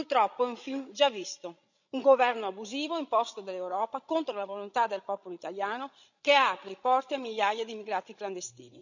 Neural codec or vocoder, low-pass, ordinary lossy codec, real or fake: codec, 16 kHz, 16 kbps, FreqCodec, larger model; 7.2 kHz; none; fake